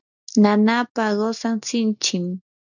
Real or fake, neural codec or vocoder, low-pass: real; none; 7.2 kHz